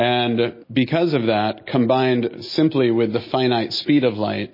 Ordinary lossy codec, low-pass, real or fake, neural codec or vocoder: MP3, 24 kbps; 5.4 kHz; real; none